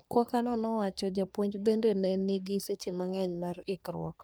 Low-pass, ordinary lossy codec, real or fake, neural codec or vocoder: none; none; fake; codec, 44.1 kHz, 3.4 kbps, Pupu-Codec